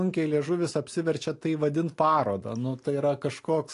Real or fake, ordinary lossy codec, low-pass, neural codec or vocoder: real; AAC, 48 kbps; 10.8 kHz; none